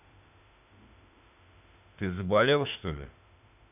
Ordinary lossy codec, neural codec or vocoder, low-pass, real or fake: none; autoencoder, 48 kHz, 32 numbers a frame, DAC-VAE, trained on Japanese speech; 3.6 kHz; fake